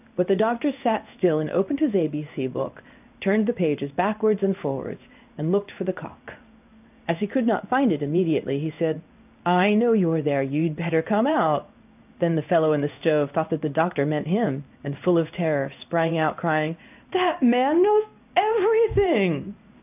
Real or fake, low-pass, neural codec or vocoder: fake; 3.6 kHz; codec, 16 kHz in and 24 kHz out, 1 kbps, XY-Tokenizer